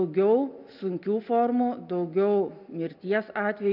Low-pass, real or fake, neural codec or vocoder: 5.4 kHz; real; none